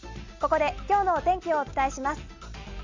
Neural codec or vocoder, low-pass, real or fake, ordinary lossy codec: none; 7.2 kHz; real; MP3, 64 kbps